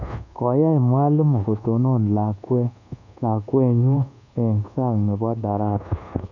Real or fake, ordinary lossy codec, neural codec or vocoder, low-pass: fake; none; codec, 24 kHz, 1.2 kbps, DualCodec; 7.2 kHz